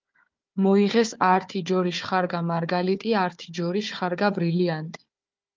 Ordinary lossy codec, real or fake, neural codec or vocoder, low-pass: Opus, 24 kbps; fake; codec, 16 kHz, 4 kbps, FunCodec, trained on Chinese and English, 50 frames a second; 7.2 kHz